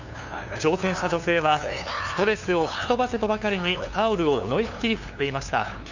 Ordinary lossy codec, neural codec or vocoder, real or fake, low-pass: none; codec, 16 kHz, 2 kbps, FunCodec, trained on LibriTTS, 25 frames a second; fake; 7.2 kHz